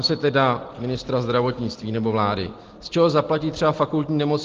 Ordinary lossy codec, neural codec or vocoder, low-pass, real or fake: Opus, 16 kbps; none; 7.2 kHz; real